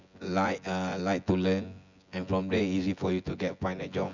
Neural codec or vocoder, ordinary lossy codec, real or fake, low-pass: vocoder, 24 kHz, 100 mel bands, Vocos; none; fake; 7.2 kHz